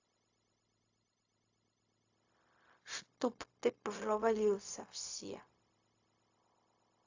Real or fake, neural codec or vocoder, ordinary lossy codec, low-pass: fake; codec, 16 kHz, 0.4 kbps, LongCat-Audio-Codec; AAC, 48 kbps; 7.2 kHz